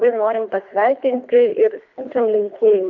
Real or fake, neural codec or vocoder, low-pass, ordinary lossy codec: fake; codec, 24 kHz, 3 kbps, HILCodec; 7.2 kHz; MP3, 64 kbps